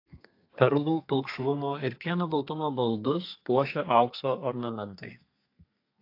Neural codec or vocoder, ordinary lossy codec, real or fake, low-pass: codec, 32 kHz, 1.9 kbps, SNAC; AAC, 32 kbps; fake; 5.4 kHz